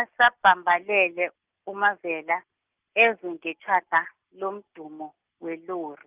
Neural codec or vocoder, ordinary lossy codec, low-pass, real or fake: none; Opus, 24 kbps; 3.6 kHz; real